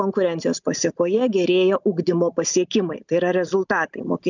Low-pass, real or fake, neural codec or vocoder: 7.2 kHz; real; none